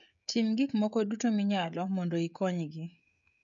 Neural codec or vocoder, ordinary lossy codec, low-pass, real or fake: codec, 16 kHz, 16 kbps, FreqCodec, smaller model; none; 7.2 kHz; fake